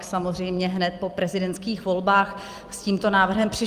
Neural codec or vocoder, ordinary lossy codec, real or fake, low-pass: vocoder, 44.1 kHz, 128 mel bands every 256 samples, BigVGAN v2; Opus, 32 kbps; fake; 14.4 kHz